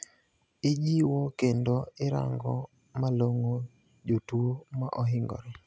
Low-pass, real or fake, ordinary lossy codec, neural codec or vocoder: none; real; none; none